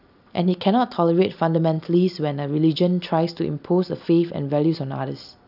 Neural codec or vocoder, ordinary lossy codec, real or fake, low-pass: none; none; real; 5.4 kHz